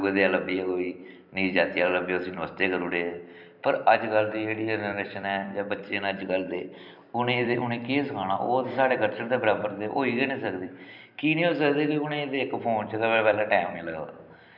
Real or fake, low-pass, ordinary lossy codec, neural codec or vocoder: fake; 5.4 kHz; none; vocoder, 44.1 kHz, 128 mel bands every 256 samples, BigVGAN v2